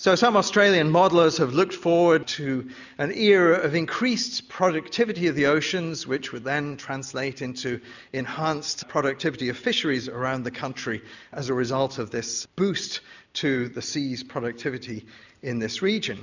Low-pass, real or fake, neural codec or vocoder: 7.2 kHz; real; none